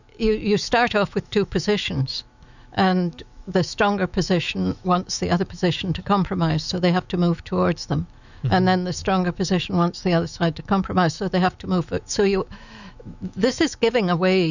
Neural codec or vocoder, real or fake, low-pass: autoencoder, 48 kHz, 128 numbers a frame, DAC-VAE, trained on Japanese speech; fake; 7.2 kHz